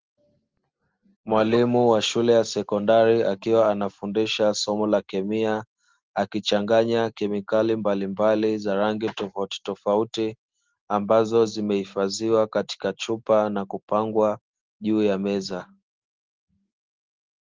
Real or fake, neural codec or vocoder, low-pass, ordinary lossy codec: real; none; 7.2 kHz; Opus, 16 kbps